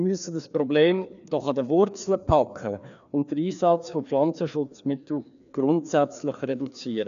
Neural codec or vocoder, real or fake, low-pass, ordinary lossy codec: codec, 16 kHz, 2 kbps, FreqCodec, larger model; fake; 7.2 kHz; none